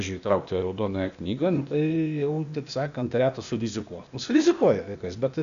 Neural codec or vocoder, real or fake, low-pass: codec, 16 kHz, 0.8 kbps, ZipCodec; fake; 7.2 kHz